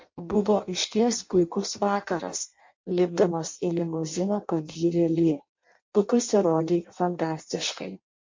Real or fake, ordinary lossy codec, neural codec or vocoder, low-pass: fake; MP3, 48 kbps; codec, 16 kHz in and 24 kHz out, 0.6 kbps, FireRedTTS-2 codec; 7.2 kHz